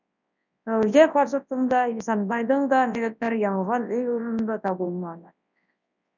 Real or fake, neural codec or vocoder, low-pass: fake; codec, 24 kHz, 0.9 kbps, WavTokenizer, large speech release; 7.2 kHz